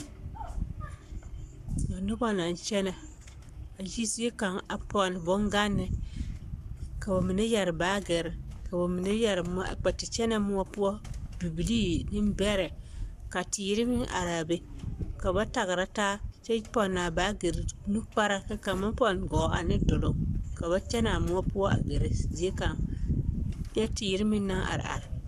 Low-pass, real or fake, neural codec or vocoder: 14.4 kHz; fake; codec, 44.1 kHz, 7.8 kbps, Pupu-Codec